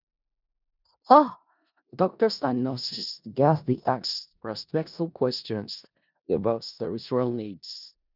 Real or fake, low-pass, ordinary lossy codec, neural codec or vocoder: fake; 5.4 kHz; none; codec, 16 kHz in and 24 kHz out, 0.4 kbps, LongCat-Audio-Codec, four codebook decoder